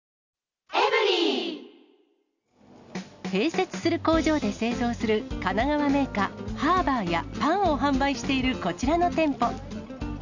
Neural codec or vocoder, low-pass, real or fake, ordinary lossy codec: none; 7.2 kHz; real; none